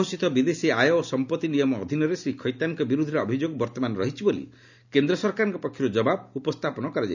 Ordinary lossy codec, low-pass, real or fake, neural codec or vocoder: none; 7.2 kHz; real; none